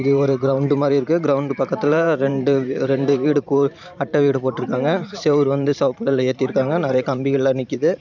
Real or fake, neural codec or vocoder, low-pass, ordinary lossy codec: fake; vocoder, 44.1 kHz, 128 mel bands every 512 samples, BigVGAN v2; 7.2 kHz; none